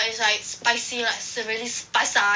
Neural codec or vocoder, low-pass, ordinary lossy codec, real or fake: none; none; none; real